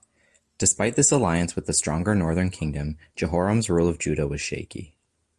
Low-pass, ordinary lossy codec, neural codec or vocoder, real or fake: 10.8 kHz; Opus, 32 kbps; none; real